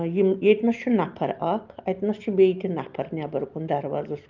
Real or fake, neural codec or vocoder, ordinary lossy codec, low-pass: real; none; Opus, 32 kbps; 7.2 kHz